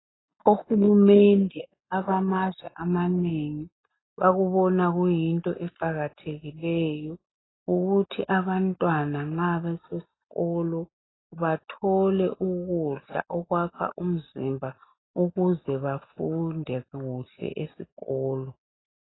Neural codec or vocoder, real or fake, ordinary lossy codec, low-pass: none; real; AAC, 16 kbps; 7.2 kHz